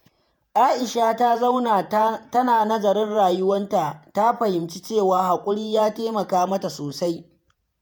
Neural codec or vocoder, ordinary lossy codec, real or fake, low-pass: vocoder, 48 kHz, 128 mel bands, Vocos; none; fake; none